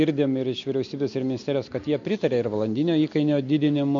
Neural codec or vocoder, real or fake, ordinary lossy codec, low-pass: none; real; MP3, 48 kbps; 7.2 kHz